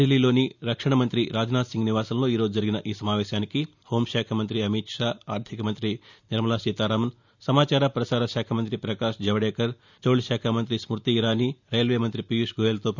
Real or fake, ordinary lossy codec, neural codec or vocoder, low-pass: real; none; none; 7.2 kHz